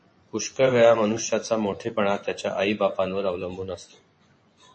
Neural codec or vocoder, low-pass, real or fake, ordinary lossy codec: vocoder, 24 kHz, 100 mel bands, Vocos; 9.9 kHz; fake; MP3, 32 kbps